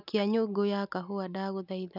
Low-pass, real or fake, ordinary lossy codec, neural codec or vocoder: 5.4 kHz; real; none; none